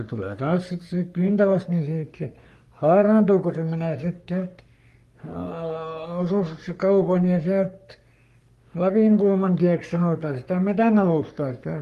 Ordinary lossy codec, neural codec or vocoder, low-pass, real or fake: Opus, 32 kbps; codec, 44.1 kHz, 3.4 kbps, Pupu-Codec; 14.4 kHz; fake